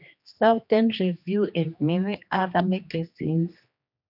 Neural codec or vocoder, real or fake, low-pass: codec, 16 kHz, 2 kbps, X-Codec, HuBERT features, trained on general audio; fake; 5.4 kHz